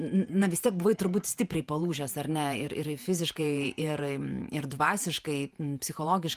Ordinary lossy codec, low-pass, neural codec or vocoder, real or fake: Opus, 32 kbps; 14.4 kHz; vocoder, 48 kHz, 128 mel bands, Vocos; fake